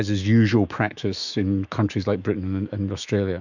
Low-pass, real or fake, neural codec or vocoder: 7.2 kHz; fake; autoencoder, 48 kHz, 128 numbers a frame, DAC-VAE, trained on Japanese speech